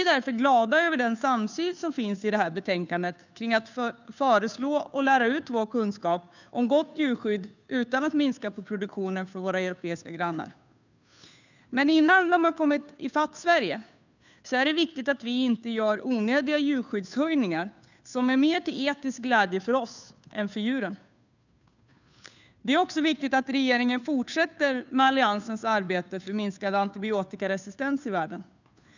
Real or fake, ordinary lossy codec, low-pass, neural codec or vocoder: fake; none; 7.2 kHz; codec, 16 kHz, 2 kbps, FunCodec, trained on Chinese and English, 25 frames a second